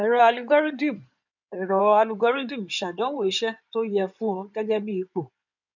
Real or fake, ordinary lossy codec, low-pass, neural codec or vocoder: fake; none; 7.2 kHz; codec, 16 kHz in and 24 kHz out, 2.2 kbps, FireRedTTS-2 codec